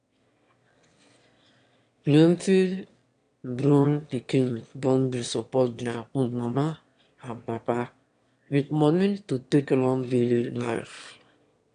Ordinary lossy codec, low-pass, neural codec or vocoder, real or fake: AAC, 64 kbps; 9.9 kHz; autoencoder, 22.05 kHz, a latent of 192 numbers a frame, VITS, trained on one speaker; fake